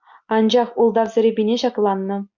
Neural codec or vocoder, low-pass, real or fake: none; 7.2 kHz; real